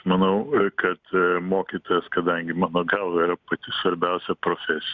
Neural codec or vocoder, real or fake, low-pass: none; real; 7.2 kHz